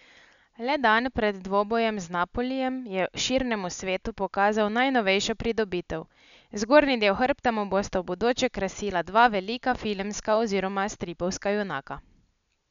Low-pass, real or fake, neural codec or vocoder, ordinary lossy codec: 7.2 kHz; real; none; none